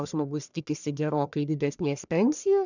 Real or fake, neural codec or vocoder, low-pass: fake; codec, 44.1 kHz, 1.7 kbps, Pupu-Codec; 7.2 kHz